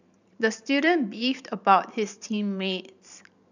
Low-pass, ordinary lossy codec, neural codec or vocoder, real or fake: 7.2 kHz; none; vocoder, 44.1 kHz, 128 mel bands every 512 samples, BigVGAN v2; fake